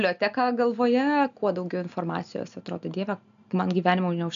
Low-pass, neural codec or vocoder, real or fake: 7.2 kHz; none; real